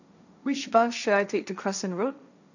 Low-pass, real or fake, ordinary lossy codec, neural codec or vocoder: 7.2 kHz; fake; none; codec, 16 kHz, 1.1 kbps, Voila-Tokenizer